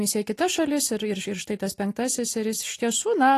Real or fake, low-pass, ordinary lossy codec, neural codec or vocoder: real; 14.4 kHz; AAC, 48 kbps; none